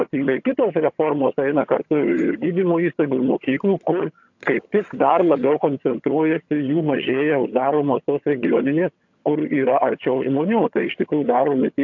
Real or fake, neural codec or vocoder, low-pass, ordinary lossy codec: fake; vocoder, 22.05 kHz, 80 mel bands, HiFi-GAN; 7.2 kHz; AAC, 48 kbps